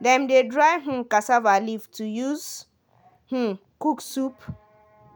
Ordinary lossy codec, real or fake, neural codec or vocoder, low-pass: none; real; none; none